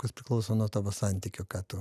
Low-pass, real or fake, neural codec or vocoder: 14.4 kHz; real; none